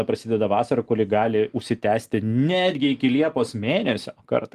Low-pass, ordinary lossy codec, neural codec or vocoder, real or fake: 14.4 kHz; Opus, 24 kbps; none; real